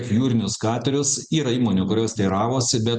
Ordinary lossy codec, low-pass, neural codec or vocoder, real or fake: Opus, 64 kbps; 9.9 kHz; none; real